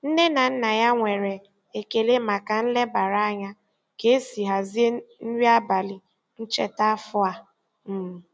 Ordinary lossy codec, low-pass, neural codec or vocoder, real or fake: none; none; none; real